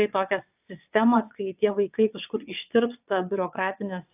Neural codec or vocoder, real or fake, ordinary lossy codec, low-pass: vocoder, 22.05 kHz, 80 mel bands, WaveNeXt; fake; AAC, 32 kbps; 3.6 kHz